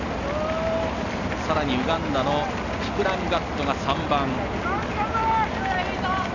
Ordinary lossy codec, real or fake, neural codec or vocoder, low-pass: none; real; none; 7.2 kHz